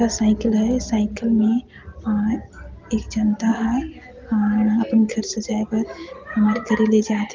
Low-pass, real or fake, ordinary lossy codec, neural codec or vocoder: 7.2 kHz; real; Opus, 24 kbps; none